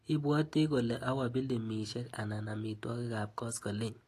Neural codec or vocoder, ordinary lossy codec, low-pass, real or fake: none; AAC, 48 kbps; 14.4 kHz; real